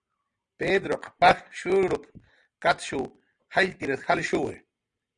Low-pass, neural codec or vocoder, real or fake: 9.9 kHz; none; real